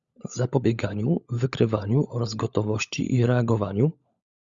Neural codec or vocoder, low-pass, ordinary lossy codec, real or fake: codec, 16 kHz, 16 kbps, FunCodec, trained on LibriTTS, 50 frames a second; 7.2 kHz; Opus, 64 kbps; fake